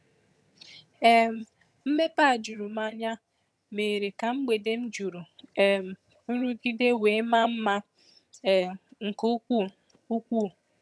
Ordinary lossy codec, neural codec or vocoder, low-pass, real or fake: none; vocoder, 22.05 kHz, 80 mel bands, HiFi-GAN; none; fake